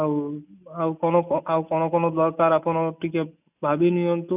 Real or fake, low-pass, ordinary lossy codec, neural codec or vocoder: real; 3.6 kHz; none; none